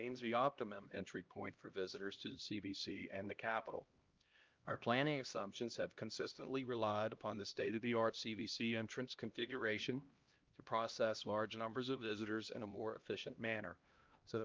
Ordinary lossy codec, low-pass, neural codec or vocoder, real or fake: Opus, 24 kbps; 7.2 kHz; codec, 16 kHz, 1 kbps, X-Codec, HuBERT features, trained on LibriSpeech; fake